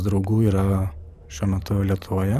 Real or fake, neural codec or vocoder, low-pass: fake; vocoder, 44.1 kHz, 128 mel bands every 512 samples, BigVGAN v2; 14.4 kHz